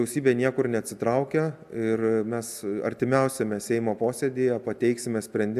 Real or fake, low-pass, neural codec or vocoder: real; 14.4 kHz; none